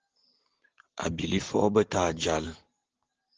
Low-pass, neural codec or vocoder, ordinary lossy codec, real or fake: 7.2 kHz; none; Opus, 16 kbps; real